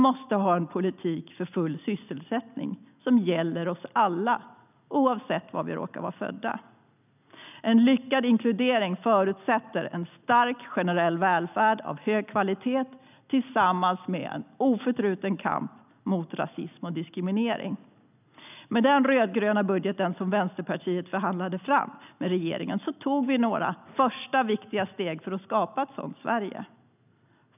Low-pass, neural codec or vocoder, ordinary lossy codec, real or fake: 3.6 kHz; none; none; real